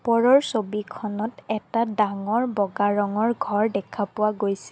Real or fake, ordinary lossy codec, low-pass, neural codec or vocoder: real; none; none; none